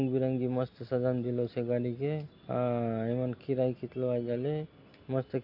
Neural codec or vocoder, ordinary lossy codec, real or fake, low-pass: none; AAC, 32 kbps; real; 5.4 kHz